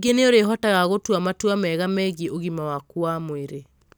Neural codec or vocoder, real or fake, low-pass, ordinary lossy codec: none; real; none; none